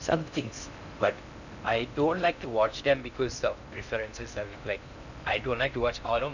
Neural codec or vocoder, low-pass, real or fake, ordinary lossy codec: codec, 16 kHz in and 24 kHz out, 0.6 kbps, FocalCodec, streaming, 4096 codes; 7.2 kHz; fake; none